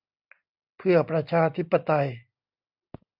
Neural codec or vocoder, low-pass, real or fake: none; 5.4 kHz; real